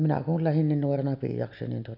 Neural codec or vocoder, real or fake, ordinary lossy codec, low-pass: none; real; none; 5.4 kHz